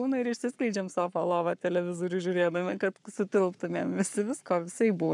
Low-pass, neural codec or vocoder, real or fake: 10.8 kHz; codec, 44.1 kHz, 7.8 kbps, Pupu-Codec; fake